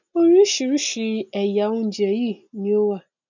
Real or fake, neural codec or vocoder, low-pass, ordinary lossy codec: real; none; 7.2 kHz; none